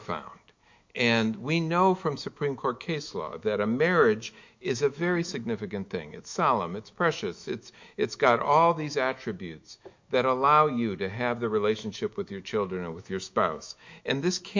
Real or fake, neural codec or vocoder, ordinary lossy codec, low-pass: fake; autoencoder, 48 kHz, 128 numbers a frame, DAC-VAE, trained on Japanese speech; MP3, 48 kbps; 7.2 kHz